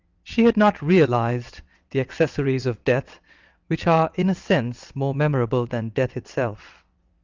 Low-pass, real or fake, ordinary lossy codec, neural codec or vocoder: 7.2 kHz; fake; Opus, 24 kbps; vocoder, 22.05 kHz, 80 mel bands, WaveNeXt